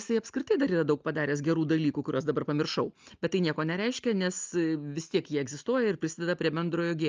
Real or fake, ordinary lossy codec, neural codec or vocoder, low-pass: real; Opus, 24 kbps; none; 7.2 kHz